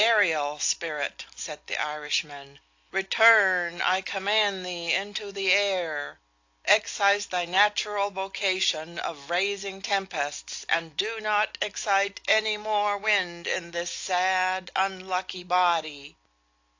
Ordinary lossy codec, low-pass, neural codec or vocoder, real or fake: AAC, 48 kbps; 7.2 kHz; none; real